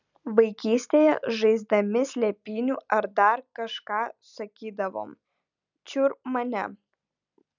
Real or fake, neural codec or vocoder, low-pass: real; none; 7.2 kHz